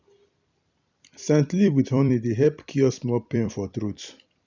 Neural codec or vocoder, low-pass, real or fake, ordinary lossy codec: vocoder, 44.1 kHz, 128 mel bands every 256 samples, BigVGAN v2; 7.2 kHz; fake; none